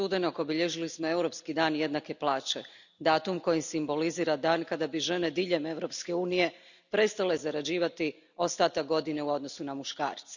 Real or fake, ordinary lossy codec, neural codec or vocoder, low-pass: real; none; none; 7.2 kHz